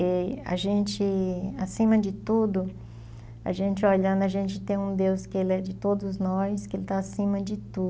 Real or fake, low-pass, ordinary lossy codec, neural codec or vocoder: real; none; none; none